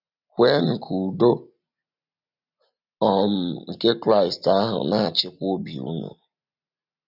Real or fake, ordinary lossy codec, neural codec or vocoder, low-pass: real; none; none; 5.4 kHz